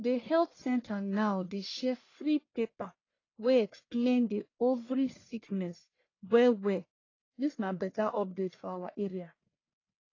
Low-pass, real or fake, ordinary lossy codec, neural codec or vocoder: 7.2 kHz; fake; AAC, 32 kbps; codec, 44.1 kHz, 1.7 kbps, Pupu-Codec